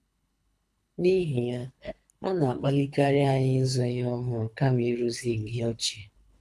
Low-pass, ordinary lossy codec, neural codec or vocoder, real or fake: none; none; codec, 24 kHz, 3 kbps, HILCodec; fake